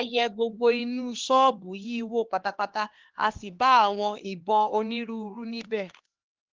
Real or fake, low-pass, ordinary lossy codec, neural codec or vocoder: fake; 7.2 kHz; Opus, 16 kbps; codec, 16 kHz, 2 kbps, X-Codec, HuBERT features, trained on LibriSpeech